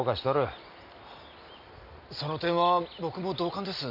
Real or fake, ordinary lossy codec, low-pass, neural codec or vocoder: real; none; 5.4 kHz; none